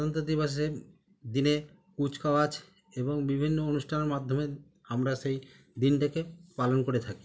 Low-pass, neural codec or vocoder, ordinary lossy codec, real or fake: none; none; none; real